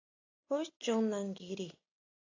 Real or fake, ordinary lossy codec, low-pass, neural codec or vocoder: real; AAC, 32 kbps; 7.2 kHz; none